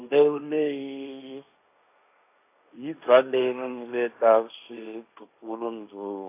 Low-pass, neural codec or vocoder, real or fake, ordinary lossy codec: 3.6 kHz; codec, 16 kHz, 1.1 kbps, Voila-Tokenizer; fake; AAC, 24 kbps